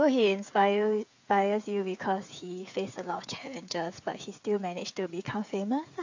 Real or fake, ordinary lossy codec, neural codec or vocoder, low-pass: fake; AAC, 48 kbps; codec, 16 kHz, 8 kbps, FreqCodec, smaller model; 7.2 kHz